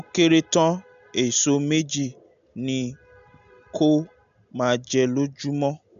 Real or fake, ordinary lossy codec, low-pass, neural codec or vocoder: real; none; 7.2 kHz; none